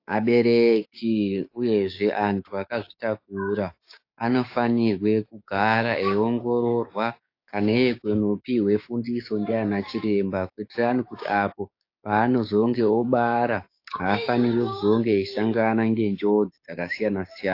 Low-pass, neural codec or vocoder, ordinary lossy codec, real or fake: 5.4 kHz; codec, 16 kHz, 6 kbps, DAC; AAC, 32 kbps; fake